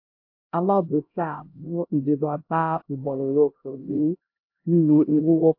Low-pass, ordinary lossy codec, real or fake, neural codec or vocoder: 5.4 kHz; AAC, 32 kbps; fake; codec, 16 kHz, 0.5 kbps, X-Codec, HuBERT features, trained on LibriSpeech